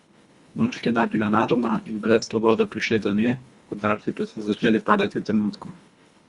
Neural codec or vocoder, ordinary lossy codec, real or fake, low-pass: codec, 24 kHz, 1.5 kbps, HILCodec; Opus, 64 kbps; fake; 10.8 kHz